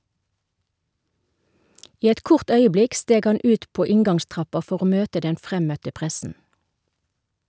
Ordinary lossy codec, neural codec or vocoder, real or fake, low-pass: none; none; real; none